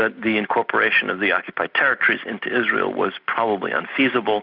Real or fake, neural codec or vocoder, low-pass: real; none; 5.4 kHz